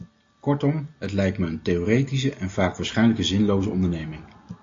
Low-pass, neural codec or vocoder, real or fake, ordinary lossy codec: 7.2 kHz; none; real; AAC, 48 kbps